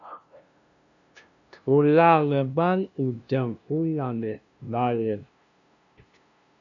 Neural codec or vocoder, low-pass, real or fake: codec, 16 kHz, 0.5 kbps, FunCodec, trained on LibriTTS, 25 frames a second; 7.2 kHz; fake